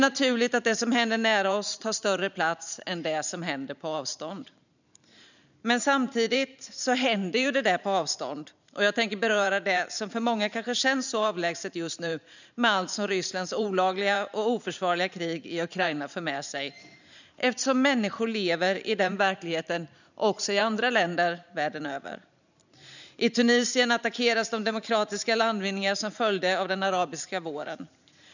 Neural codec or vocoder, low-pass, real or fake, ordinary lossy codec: vocoder, 44.1 kHz, 128 mel bands every 512 samples, BigVGAN v2; 7.2 kHz; fake; none